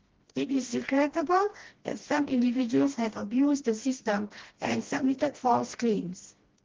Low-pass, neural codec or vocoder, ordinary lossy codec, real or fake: 7.2 kHz; codec, 16 kHz, 1 kbps, FreqCodec, smaller model; Opus, 16 kbps; fake